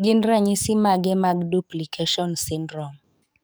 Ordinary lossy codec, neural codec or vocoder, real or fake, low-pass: none; codec, 44.1 kHz, 7.8 kbps, DAC; fake; none